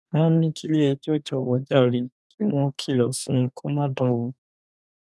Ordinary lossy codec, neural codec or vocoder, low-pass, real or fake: none; codec, 24 kHz, 1 kbps, SNAC; none; fake